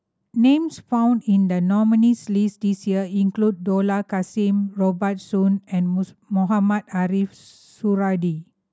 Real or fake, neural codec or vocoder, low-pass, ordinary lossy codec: real; none; none; none